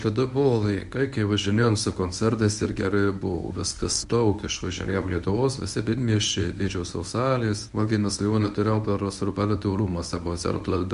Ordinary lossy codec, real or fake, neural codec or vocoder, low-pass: AAC, 96 kbps; fake; codec, 24 kHz, 0.9 kbps, WavTokenizer, medium speech release version 1; 10.8 kHz